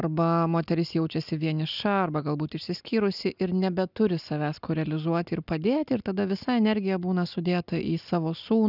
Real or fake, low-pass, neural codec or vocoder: real; 5.4 kHz; none